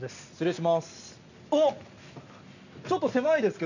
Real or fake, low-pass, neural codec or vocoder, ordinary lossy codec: real; 7.2 kHz; none; none